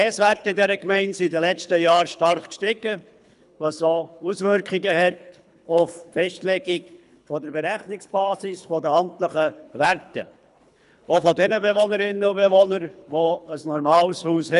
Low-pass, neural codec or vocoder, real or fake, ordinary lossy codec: 10.8 kHz; codec, 24 kHz, 3 kbps, HILCodec; fake; none